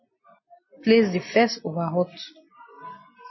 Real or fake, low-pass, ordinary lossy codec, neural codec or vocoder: real; 7.2 kHz; MP3, 24 kbps; none